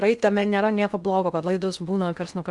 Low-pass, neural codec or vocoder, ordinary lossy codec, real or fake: 10.8 kHz; codec, 16 kHz in and 24 kHz out, 0.6 kbps, FocalCodec, streaming, 2048 codes; Opus, 64 kbps; fake